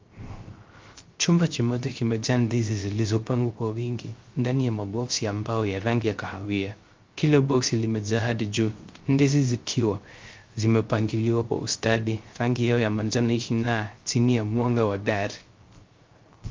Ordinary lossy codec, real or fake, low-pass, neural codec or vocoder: Opus, 24 kbps; fake; 7.2 kHz; codec, 16 kHz, 0.3 kbps, FocalCodec